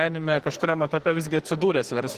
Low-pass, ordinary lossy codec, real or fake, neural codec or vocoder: 14.4 kHz; Opus, 16 kbps; fake; codec, 44.1 kHz, 2.6 kbps, SNAC